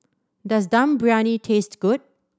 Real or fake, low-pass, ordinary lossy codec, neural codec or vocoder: real; none; none; none